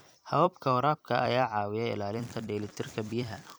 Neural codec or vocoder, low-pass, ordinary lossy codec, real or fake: vocoder, 44.1 kHz, 128 mel bands every 256 samples, BigVGAN v2; none; none; fake